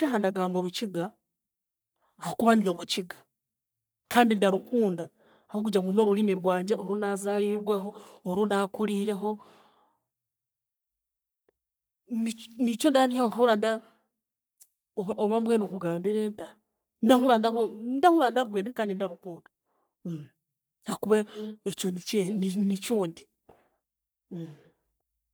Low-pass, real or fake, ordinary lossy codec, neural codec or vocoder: none; fake; none; codec, 44.1 kHz, 3.4 kbps, Pupu-Codec